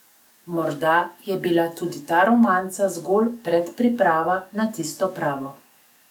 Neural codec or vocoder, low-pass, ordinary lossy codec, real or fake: codec, 44.1 kHz, 7.8 kbps, DAC; 19.8 kHz; none; fake